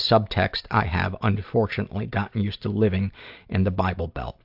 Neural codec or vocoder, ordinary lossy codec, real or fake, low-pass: none; AAC, 48 kbps; real; 5.4 kHz